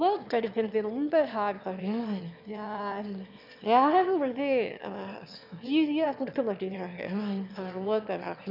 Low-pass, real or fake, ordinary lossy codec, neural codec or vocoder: 5.4 kHz; fake; none; autoencoder, 22.05 kHz, a latent of 192 numbers a frame, VITS, trained on one speaker